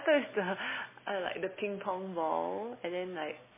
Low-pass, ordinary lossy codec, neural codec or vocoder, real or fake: 3.6 kHz; MP3, 16 kbps; none; real